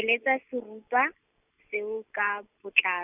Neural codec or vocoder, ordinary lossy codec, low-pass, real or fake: none; none; 3.6 kHz; real